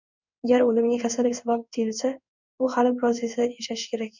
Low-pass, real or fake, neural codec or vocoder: 7.2 kHz; fake; codec, 16 kHz in and 24 kHz out, 1 kbps, XY-Tokenizer